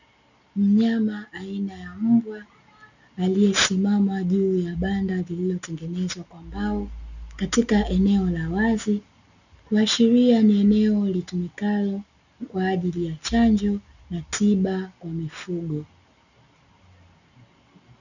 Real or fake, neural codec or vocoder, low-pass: real; none; 7.2 kHz